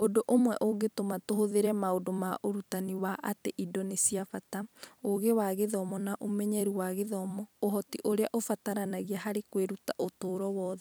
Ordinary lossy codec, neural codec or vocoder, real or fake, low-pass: none; vocoder, 44.1 kHz, 128 mel bands every 256 samples, BigVGAN v2; fake; none